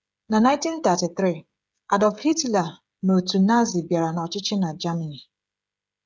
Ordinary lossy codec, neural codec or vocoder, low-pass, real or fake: none; codec, 16 kHz, 16 kbps, FreqCodec, smaller model; none; fake